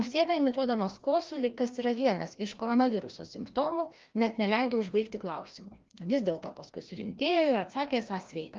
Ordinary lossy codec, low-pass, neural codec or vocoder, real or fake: Opus, 32 kbps; 7.2 kHz; codec, 16 kHz, 1 kbps, FreqCodec, larger model; fake